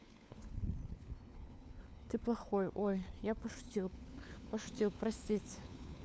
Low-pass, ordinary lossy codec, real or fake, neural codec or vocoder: none; none; fake; codec, 16 kHz, 4 kbps, FunCodec, trained on LibriTTS, 50 frames a second